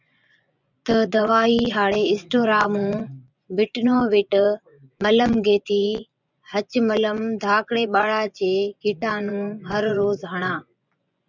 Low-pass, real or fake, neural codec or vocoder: 7.2 kHz; fake; vocoder, 24 kHz, 100 mel bands, Vocos